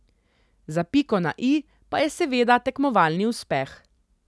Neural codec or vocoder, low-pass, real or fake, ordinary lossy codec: none; none; real; none